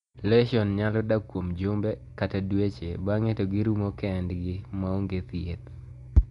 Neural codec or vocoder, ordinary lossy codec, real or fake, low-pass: none; none; real; 10.8 kHz